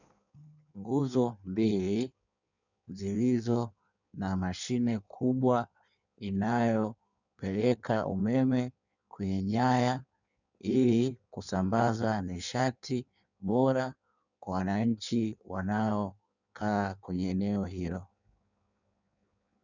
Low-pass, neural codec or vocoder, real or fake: 7.2 kHz; codec, 16 kHz in and 24 kHz out, 1.1 kbps, FireRedTTS-2 codec; fake